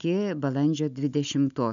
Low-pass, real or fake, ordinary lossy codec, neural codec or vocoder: 7.2 kHz; real; MP3, 96 kbps; none